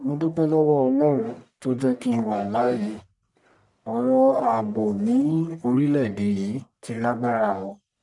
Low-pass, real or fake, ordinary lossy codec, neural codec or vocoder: 10.8 kHz; fake; none; codec, 44.1 kHz, 1.7 kbps, Pupu-Codec